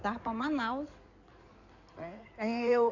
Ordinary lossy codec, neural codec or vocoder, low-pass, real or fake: none; codec, 16 kHz in and 24 kHz out, 2.2 kbps, FireRedTTS-2 codec; 7.2 kHz; fake